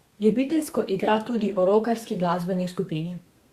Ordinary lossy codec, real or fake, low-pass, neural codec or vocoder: Opus, 64 kbps; fake; 14.4 kHz; codec, 32 kHz, 1.9 kbps, SNAC